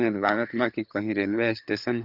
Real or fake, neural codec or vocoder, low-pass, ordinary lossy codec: fake; codec, 16 kHz, 4 kbps, FunCodec, trained on LibriTTS, 50 frames a second; 5.4 kHz; none